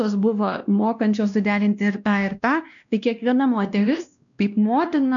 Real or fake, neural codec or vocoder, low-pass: fake; codec, 16 kHz, 1 kbps, X-Codec, WavLM features, trained on Multilingual LibriSpeech; 7.2 kHz